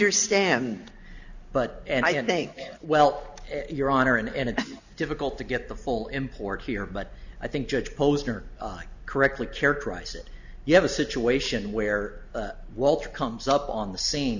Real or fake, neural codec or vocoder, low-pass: real; none; 7.2 kHz